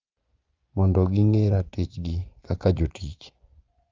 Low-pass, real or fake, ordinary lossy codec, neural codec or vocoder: 7.2 kHz; fake; Opus, 24 kbps; vocoder, 24 kHz, 100 mel bands, Vocos